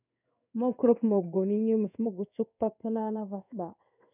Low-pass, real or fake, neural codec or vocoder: 3.6 kHz; fake; codec, 16 kHz in and 24 kHz out, 1 kbps, XY-Tokenizer